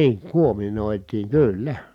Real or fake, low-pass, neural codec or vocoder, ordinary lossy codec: real; 19.8 kHz; none; none